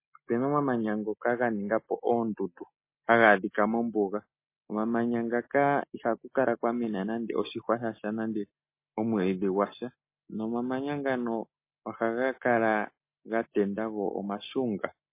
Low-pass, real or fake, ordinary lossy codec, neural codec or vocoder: 3.6 kHz; real; MP3, 24 kbps; none